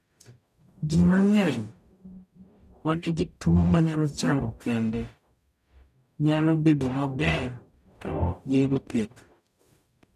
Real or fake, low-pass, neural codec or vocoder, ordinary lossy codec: fake; 14.4 kHz; codec, 44.1 kHz, 0.9 kbps, DAC; none